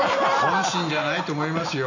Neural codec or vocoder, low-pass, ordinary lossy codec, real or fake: none; 7.2 kHz; none; real